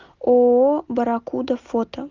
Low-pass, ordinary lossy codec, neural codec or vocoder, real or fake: 7.2 kHz; Opus, 32 kbps; none; real